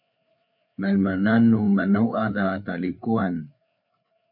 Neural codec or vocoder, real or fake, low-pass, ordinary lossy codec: codec, 16 kHz, 4 kbps, FreqCodec, larger model; fake; 5.4 kHz; MP3, 48 kbps